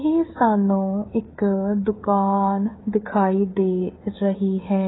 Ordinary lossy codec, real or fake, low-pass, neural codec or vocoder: AAC, 16 kbps; fake; 7.2 kHz; codec, 16 kHz, 8 kbps, FreqCodec, smaller model